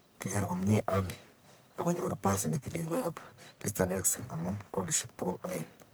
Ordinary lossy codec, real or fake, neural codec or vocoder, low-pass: none; fake; codec, 44.1 kHz, 1.7 kbps, Pupu-Codec; none